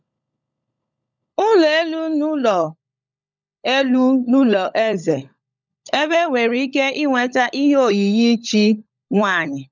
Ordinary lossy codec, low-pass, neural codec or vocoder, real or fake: none; 7.2 kHz; codec, 16 kHz, 16 kbps, FunCodec, trained on LibriTTS, 50 frames a second; fake